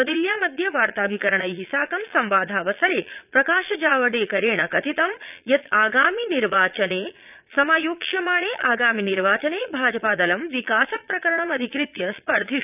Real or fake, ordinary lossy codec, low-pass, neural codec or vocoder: fake; none; 3.6 kHz; vocoder, 22.05 kHz, 80 mel bands, Vocos